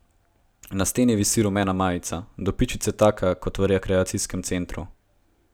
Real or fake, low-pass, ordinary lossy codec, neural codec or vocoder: real; none; none; none